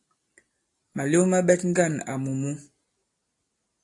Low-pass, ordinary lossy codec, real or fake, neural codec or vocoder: 10.8 kHz; AAC, 48 kbps; real; none